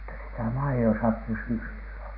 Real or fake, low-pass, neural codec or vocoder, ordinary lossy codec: real; 5.4 kHz; none; none